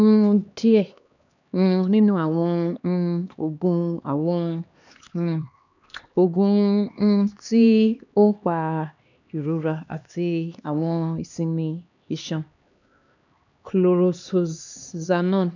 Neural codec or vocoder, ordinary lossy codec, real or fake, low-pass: codec, 16 kHz, 2 kbps, X-Codec, HuBERT features, trained on LibriSpeech; none; fake; 7.2 kHz